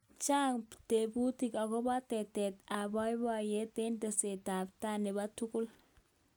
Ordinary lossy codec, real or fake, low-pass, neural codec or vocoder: none; real; none; none